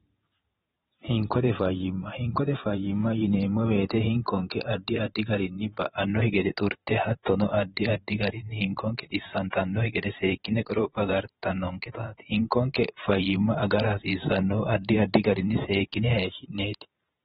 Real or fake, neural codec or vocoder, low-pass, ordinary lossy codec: fake; vocoder, 44.1 kHz, 128 mel bands every 512 samples, BigVGAN v2; 19.8 kHz; AAC, 16 kbps